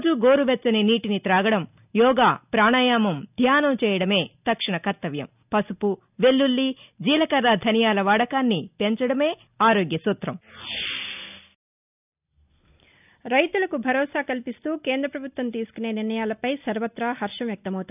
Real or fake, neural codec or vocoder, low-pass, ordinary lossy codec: real; none; 3.6 kHz; none